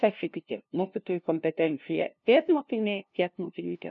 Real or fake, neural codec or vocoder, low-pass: fake; codec, 16 kHz, 0.5 kbps, FunCodec, trained on LibriTTS, 25 frames a second; 7.2 kHz